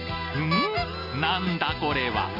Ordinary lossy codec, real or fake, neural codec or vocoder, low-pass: none; real; none; 5.4 kHz